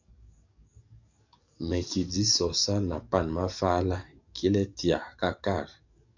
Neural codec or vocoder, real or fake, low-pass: codec, 44.1 kHz, 7.8 kbps, DAC; fake; 7.2 kHz